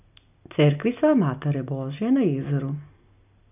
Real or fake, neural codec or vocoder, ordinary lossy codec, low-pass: real; none; none; 3.6 kHz